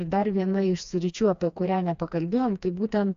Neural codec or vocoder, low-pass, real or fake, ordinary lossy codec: codec, 16 kHz, 2 kbps, FreqCodec, smaller model; 7.2 kHz; fake; AAC, 96 kbps